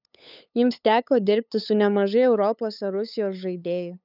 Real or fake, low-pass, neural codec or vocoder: fake; 5.4 kHz; codec, 16 kHz, 8 kbps, FunCodec, trained on LibriTTS, 25 frames a second